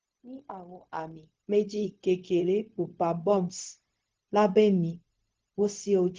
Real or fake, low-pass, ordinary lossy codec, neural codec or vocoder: fake; 7.2 kHz; Opus, 16 kbps; codec, 16 kHz, 0.4 kbps, LongCat-Audio-Codec